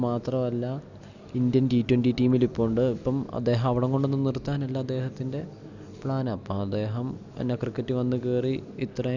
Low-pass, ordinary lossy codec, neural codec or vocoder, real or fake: 7.2 kHz; none; none; real